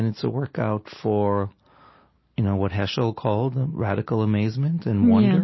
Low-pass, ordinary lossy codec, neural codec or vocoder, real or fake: 7.2 kHz; MP3, 24 kbps; none; real